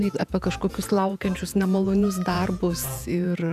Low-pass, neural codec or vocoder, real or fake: 14.4 kHz; vocoder, 48 kHz, 128 mel bands, Vocos; fake